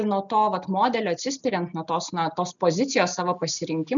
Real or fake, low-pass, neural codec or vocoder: real; 7.2 kHz; none